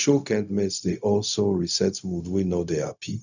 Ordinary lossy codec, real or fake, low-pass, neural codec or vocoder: none; fake; 7.2 kHz; codec, 16 kHz, 0.4 kbps, LongCat-Audio-Codec